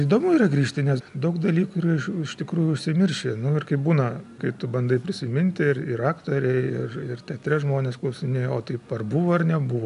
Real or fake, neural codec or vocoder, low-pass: real; none; 10.8 kHz